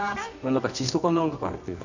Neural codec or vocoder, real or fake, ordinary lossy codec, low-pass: codec, 24 kHz, 0.9 kbps, WavTokenizer, medium music audio release; fake; none; 7.2 kHz